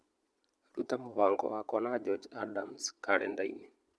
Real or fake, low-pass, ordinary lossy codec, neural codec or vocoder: fake; none; none; vocoder, 22.05 kHz, 80 mel bands, Vocos